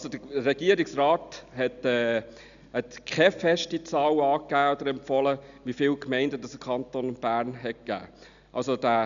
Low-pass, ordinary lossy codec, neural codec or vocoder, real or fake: 7.2 kHz; none; none; real